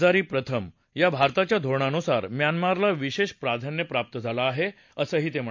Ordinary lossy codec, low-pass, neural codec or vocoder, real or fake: MP3, 48 kbps; 7.2 kHz; none; real